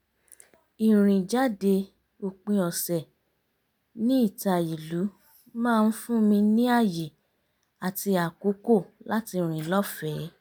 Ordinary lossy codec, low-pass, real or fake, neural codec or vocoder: none; none; real; none